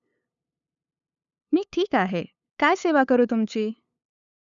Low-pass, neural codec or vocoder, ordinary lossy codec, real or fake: 7.2 kHz; codec, 16 kHz, 8 kbps, FunCodec, trained on LibriTTS, 25 frames a second; none; fake